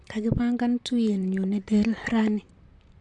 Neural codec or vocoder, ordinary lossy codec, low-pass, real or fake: vocoder, 44.1 kHz, 128 mel bands, Pupu-Vocoder; AAC, 64 kbps; 10.8 kHz; fake